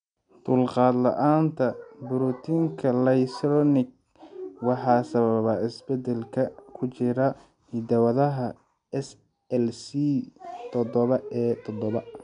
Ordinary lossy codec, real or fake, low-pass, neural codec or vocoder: none; real; 9.9 kHz; none